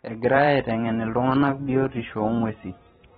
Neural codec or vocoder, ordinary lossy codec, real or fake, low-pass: vocoder, 44.1 kHz, 128 mel bands every 512 samples, BigVGAN v2; AAC, 16 kbps; fake; 19.8 kHz